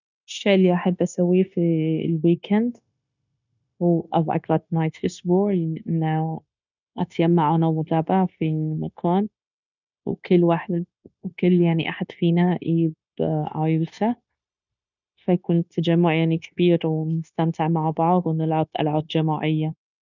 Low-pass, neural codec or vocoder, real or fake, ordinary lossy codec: 7.2 kHz; codec, 16 kHz, 0.9 kbps, LongCat-Audio-Codec; fake; none